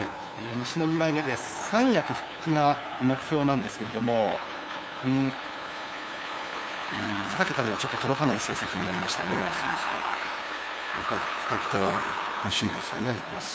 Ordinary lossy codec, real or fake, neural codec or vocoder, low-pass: none; fake; codec, 16 kHz, 2 kbps, FunCodec, trained on LibriTTS, 25 frames a second; none